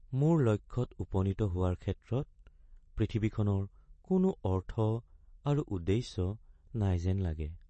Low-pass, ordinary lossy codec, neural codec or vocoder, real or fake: 10.8 kHz; MP3, 32 kbps; none; real